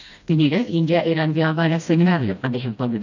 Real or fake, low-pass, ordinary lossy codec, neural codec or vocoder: fake; 7.2 kHz; none; codec, 16 kHz, 1 kbps, FreqCodec, smaller model